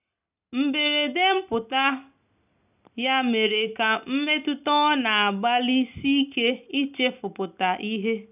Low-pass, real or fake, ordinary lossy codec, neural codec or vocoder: 3.6 kHz; real; none; none